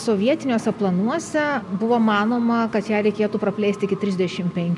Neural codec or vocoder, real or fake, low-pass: none; real; 10.8 kHz